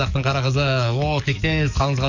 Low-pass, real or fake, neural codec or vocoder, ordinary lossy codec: 7.2 kHz; fake; codec, 44.1 kHz, 7.8 kbps, DAC; none